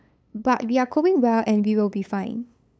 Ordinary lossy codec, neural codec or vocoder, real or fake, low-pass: none; codec, 16 kHz, 8 kbps, FunCodec, trained on LibriTTS, 25 frames a second; fake; none